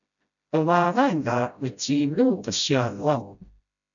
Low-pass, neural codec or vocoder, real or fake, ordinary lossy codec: 7.2 kHz; codec, 16 kHz, 0.5 kbps, FreqCodec, smaller model; fake; MP3, 96 kbps